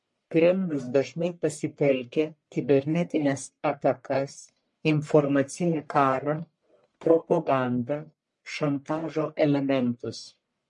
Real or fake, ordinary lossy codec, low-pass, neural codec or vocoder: fake; MP3, 48 kbps; 10.8 kHz; codec, 44.1 kHz, 1.7 kbps, Pupu-Codec